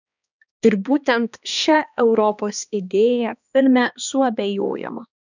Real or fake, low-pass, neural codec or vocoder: fake; 7.2 kHz; codec, 16 kHz, 2 kbps, X-Codec, HuBERT features, trained on balanced general audio